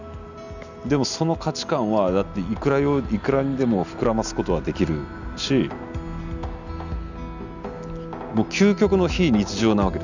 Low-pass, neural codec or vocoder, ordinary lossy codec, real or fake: 7.2 kHz; none; none; real